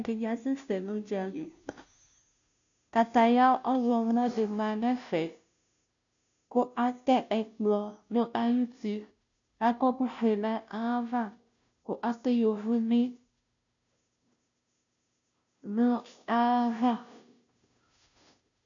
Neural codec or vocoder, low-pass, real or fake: codec, 16 kHz, 0.5 kbps, FunCodec, trained on Chinese and English, 25 frames a second; 7.2 kHz; fake